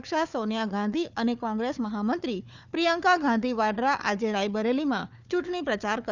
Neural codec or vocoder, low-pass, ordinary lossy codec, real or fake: codec, 16 kHz, 4 kbps, FunCodec, trained on Chinese and English, 50 frames a second; 7.2 kHz; none; fake